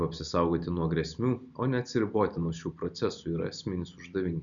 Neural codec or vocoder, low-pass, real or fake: none; 7.2 kHz; real